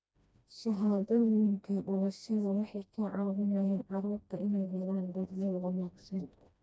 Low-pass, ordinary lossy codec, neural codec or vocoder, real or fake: none; none; codec, 16 kHz, 1 kbps, FreqCodec, smaller model; fake